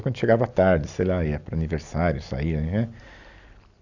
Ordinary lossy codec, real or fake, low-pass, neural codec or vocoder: none; real; 7.2 kHz; none